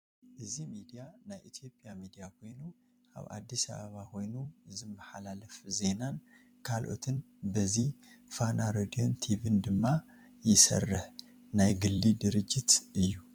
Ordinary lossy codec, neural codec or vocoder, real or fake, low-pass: MP3, 96 kbps; vocoder, 44.1 kHz, 128 mel bands every 256 samples, BigVGAN v2; fake; 19.8 kHz